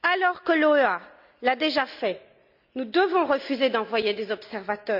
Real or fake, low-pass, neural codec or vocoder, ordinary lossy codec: real; 5.4 kHz; none; none